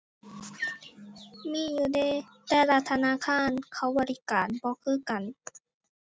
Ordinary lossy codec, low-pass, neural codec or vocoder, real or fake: none; none; none; real